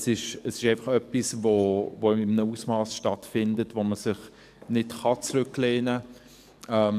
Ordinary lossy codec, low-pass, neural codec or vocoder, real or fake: AAC, 96 kbps; 14.4 kHz; codec, 44.1 kHz, 7.8 kbps, DAC; fake